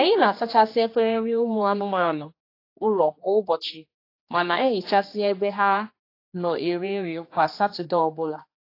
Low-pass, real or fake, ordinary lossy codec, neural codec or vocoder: 5.4 kHz; fake; AAC, 32 kbps; codec, 16 kHz, 2 kbps, X-Codec, HuBERT features, trained on general audio